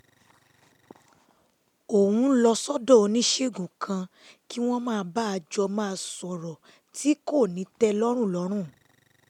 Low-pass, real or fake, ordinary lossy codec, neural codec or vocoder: 19.8 kHz; real; none; none